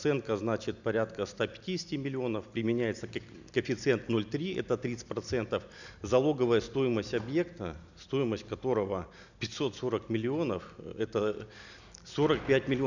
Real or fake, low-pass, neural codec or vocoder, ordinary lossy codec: real; 7.2 kHz; none; none